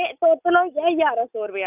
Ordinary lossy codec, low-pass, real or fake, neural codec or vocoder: none; 3.6 kHz; real; none